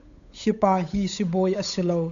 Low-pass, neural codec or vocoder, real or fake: 7.2 kHz; codec, 16 kHz, 8 kbps, FunCodec, trained on Chinese and English, 25 frames a second; fake